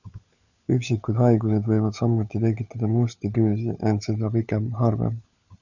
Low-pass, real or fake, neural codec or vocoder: 7.2 kHz; fake; codec, 16 kHz, 16 kbps, FunCodec, trained on LibriTTS, 50 frames a second